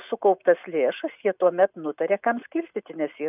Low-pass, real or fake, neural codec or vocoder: 3.6 kHz; real; none